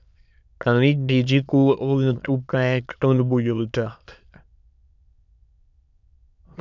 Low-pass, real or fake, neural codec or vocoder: 7.2 kHz; fake; autoencoder, 22.05 kHz, a latent of 192 numbers a frame, VITS, trained on many speakers